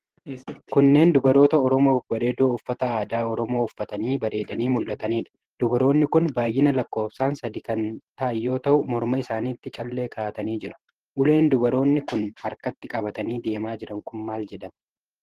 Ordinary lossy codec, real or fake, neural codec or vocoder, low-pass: Opus, 16 kbps; fake; vocoder, 44.1 kHz, 128 mel bands every 512 samples, BigVGAN v2; 14.4 kHz